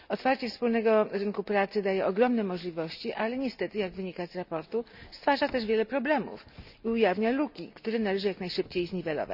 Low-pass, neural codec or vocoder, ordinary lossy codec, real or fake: 5.4 kHz; none; none; real